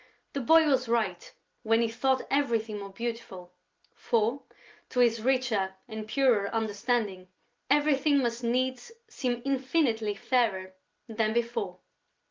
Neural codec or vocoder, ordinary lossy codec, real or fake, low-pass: none; Opus, 32 kbps; real; 7.2 kHz